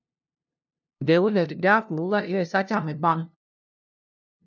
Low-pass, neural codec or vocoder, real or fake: 7.2 kHz; codec, 16 kHz, 0.5 kbps, FunCodec, trained on LibriTTS, 25 frames a second; fake